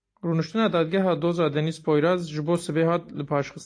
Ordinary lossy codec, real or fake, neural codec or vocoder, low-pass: AAC, 48 kbps; real; none; 9.9 kHz